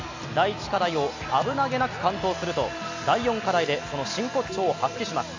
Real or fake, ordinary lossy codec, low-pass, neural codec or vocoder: real; AAC, 48 kbps; 7.2 kHz; none